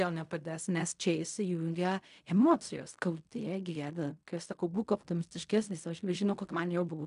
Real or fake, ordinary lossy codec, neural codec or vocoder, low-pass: fake; MP3, 96 kbps; codec, 16 kHz in and 24 kHz out, 0.4 kbps, LongCat-Audio-Codec, fine tuned four codebook decoder; 10.8 kHz